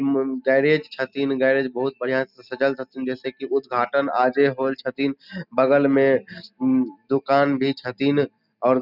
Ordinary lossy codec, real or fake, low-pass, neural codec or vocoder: none; real; 5.4 kHz; none